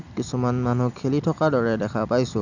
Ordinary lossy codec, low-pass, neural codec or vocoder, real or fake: none; 7.2 kHz; none; real